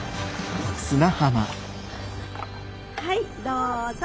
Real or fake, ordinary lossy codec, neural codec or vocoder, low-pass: real; none; none; none